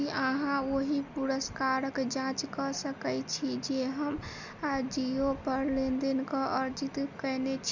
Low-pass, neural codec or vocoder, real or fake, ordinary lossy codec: 7.2 kHz; none; real; none